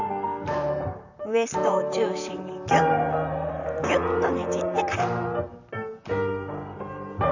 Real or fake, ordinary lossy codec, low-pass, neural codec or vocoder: fake; none; 7.2 kHz; vocoder, 44.1 kHz, 128 mel bands, Pupu-Vocoder